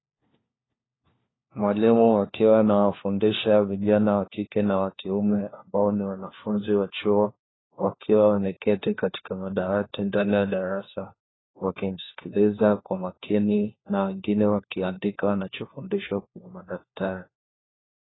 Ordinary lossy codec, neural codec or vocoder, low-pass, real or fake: AAC, 16 kbps; codec, 16 kHz, 1 kbps, FunCodec, trained on LibriTTS, 50 frames a second; 7.2 kHz; fake